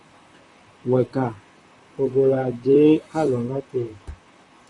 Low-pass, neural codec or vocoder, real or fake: 10.8 kHz; vocoder, 44.1 kHz, 128 mel bands, Pupu-Vocoder; fake